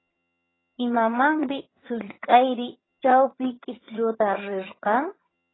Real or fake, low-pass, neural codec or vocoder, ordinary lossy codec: fake; 7.2 kHz; vocoder, 22.05 kHz, 80 mel bands, HiFi-GAN; AAC, 16 kbps